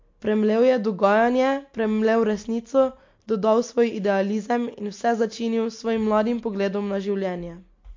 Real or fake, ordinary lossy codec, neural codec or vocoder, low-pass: real; MP3, 48 kbps; none; 7.2 kHz